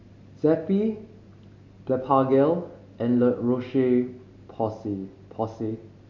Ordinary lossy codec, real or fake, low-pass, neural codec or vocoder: MP3, 48 kbps; real; 7.2 kHz; none